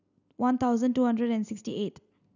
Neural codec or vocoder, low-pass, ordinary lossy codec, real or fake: none; 7.2 kHz; none; real